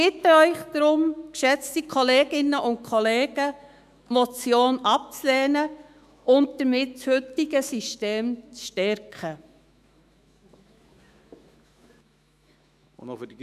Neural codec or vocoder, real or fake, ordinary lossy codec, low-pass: autoencoder, 48 kHz, 128 numbers a frame, DAC-VAE, trained on Japanese speech; fake; none; 14.4 kHz